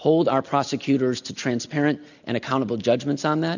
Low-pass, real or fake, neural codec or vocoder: 7.2 kHz; real; none